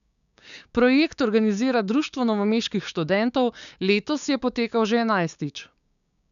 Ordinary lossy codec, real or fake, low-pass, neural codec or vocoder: none; fake; 7.2 kHz; codec, 16 kHz, 6 kbps, DAC